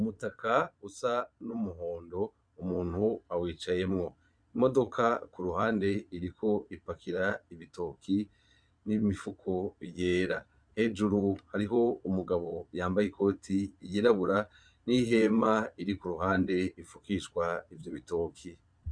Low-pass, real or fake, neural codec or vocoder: 9.9 kHz; fake; vocoder, 22.05 kHz, 80 mel bands, Vocos